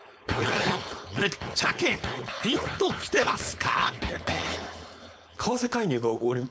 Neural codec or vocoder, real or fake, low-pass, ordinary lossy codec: codec, 16 kHz, 4.8 kbps, FACodec; fake; none; none